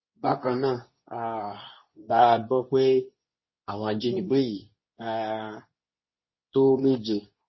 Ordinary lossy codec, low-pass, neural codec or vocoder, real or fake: MP3, 24 kbps; 7.2 kHz; codec, 24 kHz, 0.9 kbps, WavTokenizer, medium speech release version 2; fake